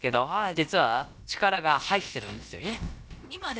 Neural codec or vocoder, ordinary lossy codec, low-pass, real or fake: codec, 16 kHz, about 1 kbps, DyCAST, with the encoder's durations; none; none; fake